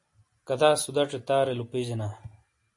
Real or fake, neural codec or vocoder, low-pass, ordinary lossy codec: real; none; 10.8 kHz; MP3, 48 kbps